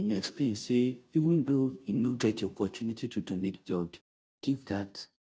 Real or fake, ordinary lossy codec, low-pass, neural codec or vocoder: fake; none; none; codec, 16 kHz, 0.5 kbps, FunCodec, trained on Chinese and English, 25 frames a second